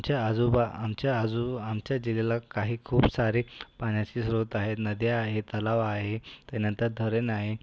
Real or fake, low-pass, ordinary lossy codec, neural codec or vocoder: real; none; none; none